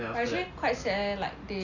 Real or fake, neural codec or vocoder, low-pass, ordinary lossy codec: real; none; 7.2 kHz; none